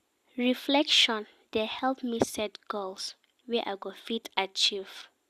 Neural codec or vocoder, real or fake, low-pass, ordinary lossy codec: none; real; 14.4 kHz; Opus, 64 kbps